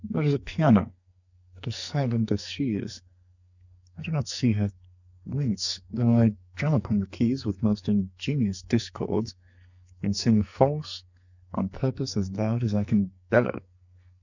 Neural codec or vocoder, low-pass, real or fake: codec, 44.1 kHz, 2.6 kbps, SNAC; 7.2 kHz; fake